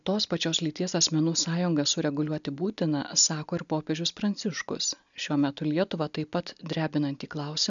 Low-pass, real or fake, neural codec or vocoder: 7.2 kHz; real; none